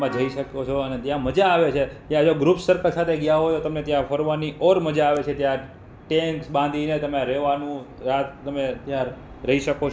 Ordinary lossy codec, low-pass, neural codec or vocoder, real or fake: none; none; none; real